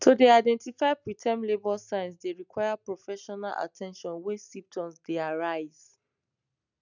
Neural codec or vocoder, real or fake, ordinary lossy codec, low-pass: none; real; none; 7.2 kHz